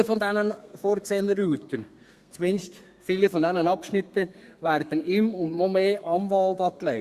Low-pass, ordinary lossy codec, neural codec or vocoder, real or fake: 14.4 kHz; Opus, 64 kbps; codec, 32 kHz, 1.9 kbps, SNAC; fake